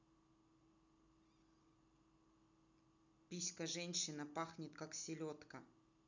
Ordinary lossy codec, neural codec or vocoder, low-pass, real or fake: none; none; 7.2 kHz; real